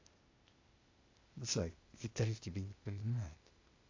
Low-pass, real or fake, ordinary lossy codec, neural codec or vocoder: 7.2 kHz; fake; AAC, 48 kbps; codec, 16 kHz, 0.8 kbps, ZipCodec